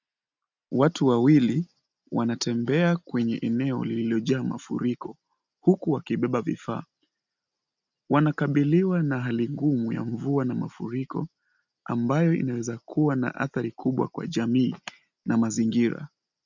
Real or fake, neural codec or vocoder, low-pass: real; none; 7.2 kHz